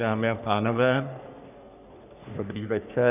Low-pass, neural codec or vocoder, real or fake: 3.6 kHz; codec, 44.1 kHz, 3.4 kbps, Pupu-Codec; fake